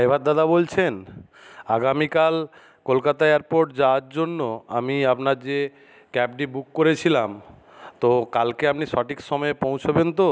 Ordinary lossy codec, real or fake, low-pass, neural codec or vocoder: none; real; none; none